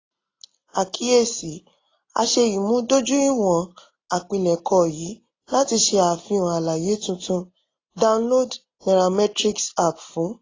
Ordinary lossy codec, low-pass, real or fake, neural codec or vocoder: AAC, 32 kbps; 7.2 kHz; real; none